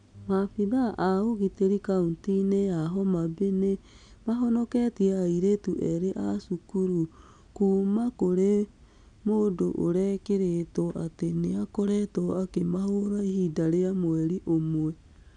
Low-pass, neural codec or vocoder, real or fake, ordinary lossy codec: 9.9 kHz; none; real; none